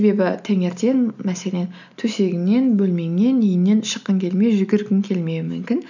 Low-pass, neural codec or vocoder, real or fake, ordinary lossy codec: 7.2 kHz; none; real; none